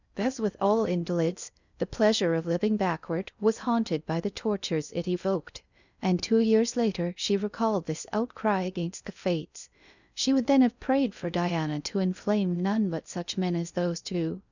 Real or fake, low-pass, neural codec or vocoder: fake; 7.2 kHz; codec, 16 kHz in and 24 kHz out, 0.6 kbps, FocalCodec, streaming, 2048 codes